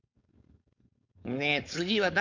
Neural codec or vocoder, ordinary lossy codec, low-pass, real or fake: codec, 16 kHz, 4.8 kbps, FACodec; MP3, 64 kbps; 7.2 kHz; fake